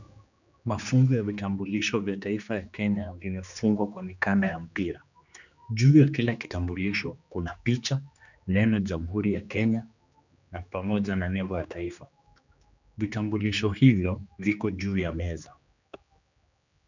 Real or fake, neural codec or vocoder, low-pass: fake; codec, 16 kHz, 2 kbps, X-Codec, HuBERT features, trained on general audio; 7.2 kHz